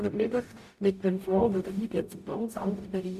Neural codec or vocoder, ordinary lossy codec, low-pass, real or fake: codec, 44.1 kHz, 0.9 kbps, DAC; MP3, 96 kbps; 14.4 kHz; fake